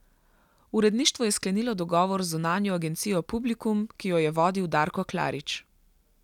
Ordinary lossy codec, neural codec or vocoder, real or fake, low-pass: none; none; real; 19.8 kHz